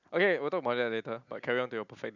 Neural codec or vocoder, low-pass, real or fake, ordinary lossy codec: none; 7.2 kHz; real; none